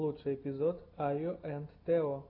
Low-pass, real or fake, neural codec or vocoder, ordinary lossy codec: 5.4 kHz; real; none; AAC, 32 kbps